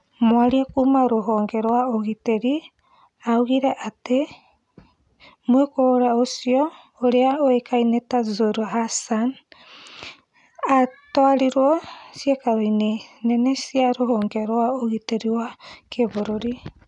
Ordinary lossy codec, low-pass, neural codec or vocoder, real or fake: none; 10.8 kHz; none; real